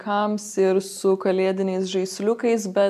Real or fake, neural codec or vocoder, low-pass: real; none; 14.4 kHz